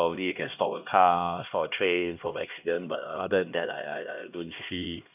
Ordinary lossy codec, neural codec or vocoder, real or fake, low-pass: none; codec, 16 kHz, 1 kbps, X-Codec, HuBERT features, trained on LibriSpeech; fake; 3.6 kHz